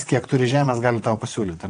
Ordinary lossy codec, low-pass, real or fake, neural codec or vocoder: AAC, 48 kbps; 9.9 kHz; fake; vocoder, 22.05 kHz, 80 mel bands, WaveNeXt